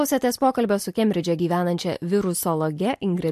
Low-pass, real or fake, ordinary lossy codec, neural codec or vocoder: 14.4 kHz; real; MP3, 64 kbps; none